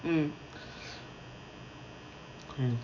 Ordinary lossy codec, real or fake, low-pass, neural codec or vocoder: none; real; 7.2 kHz; none